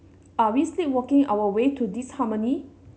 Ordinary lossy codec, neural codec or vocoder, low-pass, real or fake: none; none; none; real